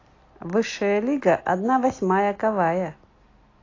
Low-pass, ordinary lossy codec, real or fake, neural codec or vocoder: 7.2 kHz; AAC, 32 kbps; real; none